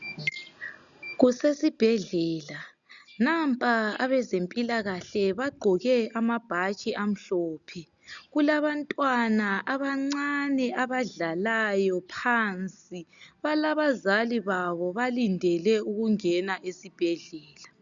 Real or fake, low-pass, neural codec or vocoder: real; 7.2 kHz; none